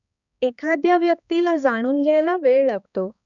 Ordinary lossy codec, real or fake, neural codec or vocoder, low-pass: none; fake; codec, 16 kHz, 2 kbps, X-Codec, HuBERT features, trained on balanced general audio; 7.2 kHz